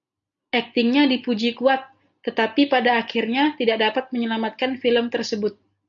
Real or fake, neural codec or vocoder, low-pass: real; none; 7.2 kHz